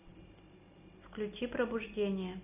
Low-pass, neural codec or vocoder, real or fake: 3.6 kHz; none; real